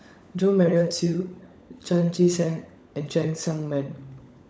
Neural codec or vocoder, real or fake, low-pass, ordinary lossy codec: codec, 16 kHz, 16 kbps, FunCodec, trained on LibriTTS, 50 frames a second; fake; none; none